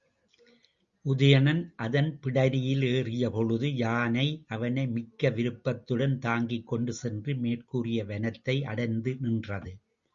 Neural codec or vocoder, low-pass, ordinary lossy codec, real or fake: none; 7.2 kHz; Opus, 64 kbps; real